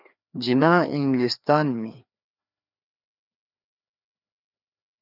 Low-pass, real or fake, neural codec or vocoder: 5.4 kHz; fake; codec, 16 kHz, 2 kbps, FreqCodec, larger model